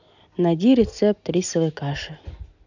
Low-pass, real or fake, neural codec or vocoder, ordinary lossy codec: 7.2 kHz; real; none; none